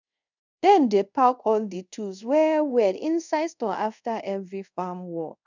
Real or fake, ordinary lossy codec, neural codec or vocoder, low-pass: fake; none; codec, 24 kHz, 0.5 kbps, DualCodec; 7.2 kHz